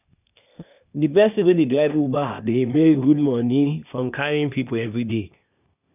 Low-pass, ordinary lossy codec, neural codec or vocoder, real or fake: 3.6 kHz; none; codec, 16 kHz, 0.8 kbps, ZipCodec; fake